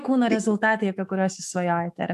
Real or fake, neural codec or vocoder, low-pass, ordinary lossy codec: fake; autoencoder, 48 kHz, 128 numbers a frame, DAC-VAE, trained on Japanese speech; 14.4 kHz; AAC, 96 kbps